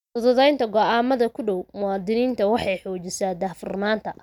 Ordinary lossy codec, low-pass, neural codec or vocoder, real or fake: none; 19.8 kHz; none; real